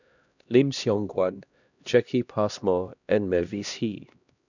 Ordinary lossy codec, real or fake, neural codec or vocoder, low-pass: none; fake; codec, 16 kHz, 1 kbps, X-Codec, HuBERT features, trained on LibriSpeech; 7.2 kHz